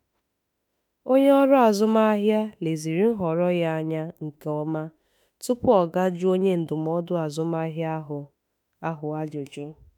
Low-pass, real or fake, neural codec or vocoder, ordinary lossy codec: none; fake; autoencoder, 48 kHz, 32 numbers a frame, DAC-VAE, trained on Japanese speech; none